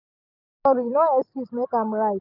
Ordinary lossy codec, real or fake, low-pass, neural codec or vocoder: none; real; 5.4 kHz; none